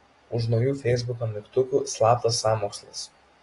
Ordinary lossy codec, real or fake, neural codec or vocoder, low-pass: AAC, 32 kbps; real; none; 10.8 kHz